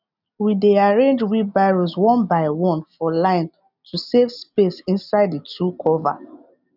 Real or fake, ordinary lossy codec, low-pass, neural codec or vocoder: real; none; 5.4 kHz; none